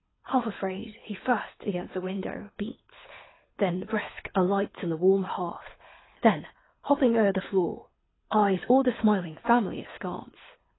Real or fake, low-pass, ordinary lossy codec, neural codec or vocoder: fake; 7.2 kHz; AAC, 16 kbps; codec, 24 kHz, 6 kbps, HILCodec